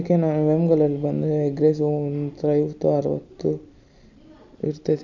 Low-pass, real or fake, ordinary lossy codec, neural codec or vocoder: 7.2 kHz; real; none; none